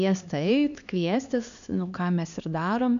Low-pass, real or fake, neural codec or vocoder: 7.2 kHz; fake; codec, 16 kHz, 2 kbps, X-Codec, HuBERT features, trained on LibriSpeech